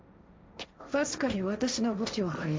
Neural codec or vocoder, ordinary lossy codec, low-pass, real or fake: codec, 16 kHz, 1.1 kbps, Voila-Tokenizer; none; none; fake